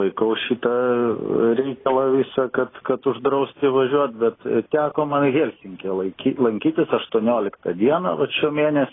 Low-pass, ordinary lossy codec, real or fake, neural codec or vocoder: 7.2 kHz; AAC, 16 kbps; real; none